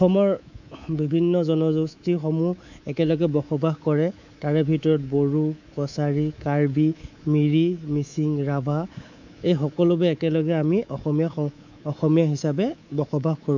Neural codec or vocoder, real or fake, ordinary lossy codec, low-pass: codec, 24 kHz, 3.1 kbps, DualCodec; fake; none; 7.2 kHz